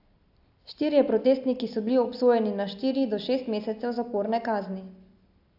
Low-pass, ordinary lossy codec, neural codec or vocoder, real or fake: 5.4 kHz; AAC, 48 kbps; none; real